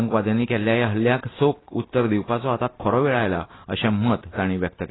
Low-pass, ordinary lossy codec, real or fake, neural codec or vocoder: 7.2 kHz; AAC, 16 kbps; real; none